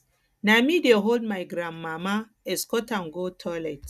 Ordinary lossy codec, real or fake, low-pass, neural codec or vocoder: none; real; 14.4 kHz; none